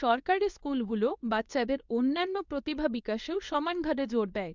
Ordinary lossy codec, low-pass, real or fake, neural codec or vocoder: none; 7.2 kHz; fake; codec, 24 kHz, 0.9 kbps, WavTokenizer, medium speech release version 2